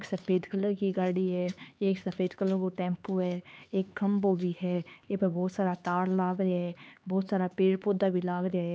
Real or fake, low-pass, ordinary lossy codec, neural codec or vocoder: fake; none; none; codec, 16 kHz, 4 kbps, X-Codec, HuBERT features, trained on LibriSpeech